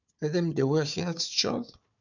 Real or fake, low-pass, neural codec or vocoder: fake; 7.2 kHz; codec, 16 kHz, 4 kbps, FunCodec, trained on Chinese and English, 50 frames a second